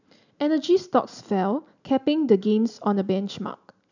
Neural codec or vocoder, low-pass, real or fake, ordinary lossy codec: none; 7.2 kHz; real; none